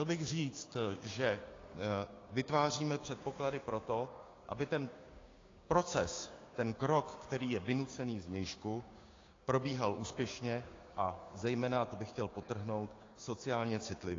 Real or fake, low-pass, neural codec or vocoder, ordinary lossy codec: fake; 7.2 kHz; codec, 16 kHz, 6 kbps, DAC; AAC, 32 kbps